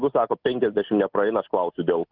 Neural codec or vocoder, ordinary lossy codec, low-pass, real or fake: none; Opus, 32 kbps; 5.4 kHz; real